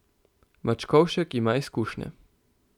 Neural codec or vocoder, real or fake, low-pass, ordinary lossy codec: none; real; 19.8 kHz; none